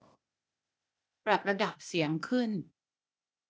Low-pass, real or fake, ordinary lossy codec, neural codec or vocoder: none; fake; none; codec, 16 kHz, 0.8 kbps, ZipCodec